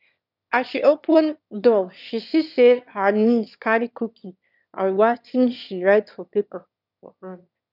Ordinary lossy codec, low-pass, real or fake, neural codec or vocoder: none; 5.4 kHz; fake; autoencoder, 22.05 kHz, a latent of 192 numbers a frame, VITS, trained on one speaker